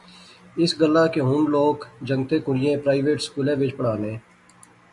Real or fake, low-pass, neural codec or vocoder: real; 10.8 kHz; none